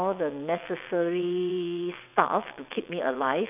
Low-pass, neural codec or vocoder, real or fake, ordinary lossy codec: 3.6 kHz; vocoder, 22.05 kHz, 80 mel bands, WaveNeXt; fake; none